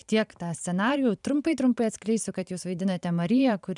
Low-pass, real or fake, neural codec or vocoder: 10.8 kHz; fake; vocoder, 44.1 kHz, 128 mel bands every 512 samples, BigVGAN v2